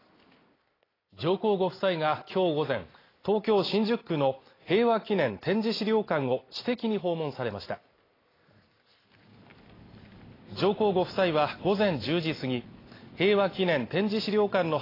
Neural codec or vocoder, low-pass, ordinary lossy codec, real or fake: none; 5.4 kHz; AAC, 24 kbps; real